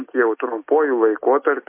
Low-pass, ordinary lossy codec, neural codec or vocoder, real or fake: 3.6 kHz; MP3, 24 kbps; none; real